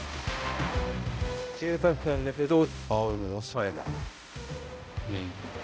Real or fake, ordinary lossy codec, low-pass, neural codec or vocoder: fake; none; none; codec, 16 kHz, 0.5 kbps, X-Codec, HuBERT features, trained on balanced general audio